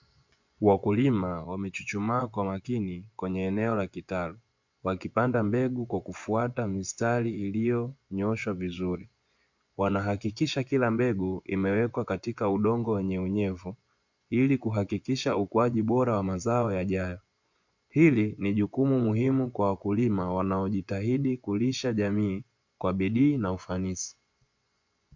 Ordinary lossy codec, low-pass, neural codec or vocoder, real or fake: MP3, 64 kbps; 7.2 kHz; vocoder, 24 kHz, 100 mel bands, Vocos; fake